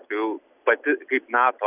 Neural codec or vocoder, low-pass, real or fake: none; 3.6 kHz; real